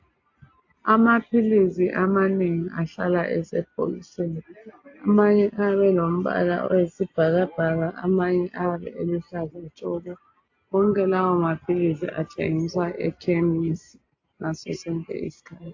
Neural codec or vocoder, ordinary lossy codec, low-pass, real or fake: none; AAC, 48 kbps; 7.2 kHz; real